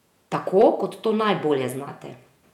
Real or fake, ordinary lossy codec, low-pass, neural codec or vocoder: fake; none; 19.8 kHz; vocoder, 48 kHz, 128 mel bands, Vocos